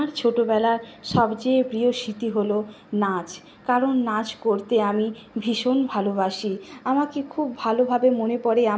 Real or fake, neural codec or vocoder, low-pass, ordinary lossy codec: real; none; none; none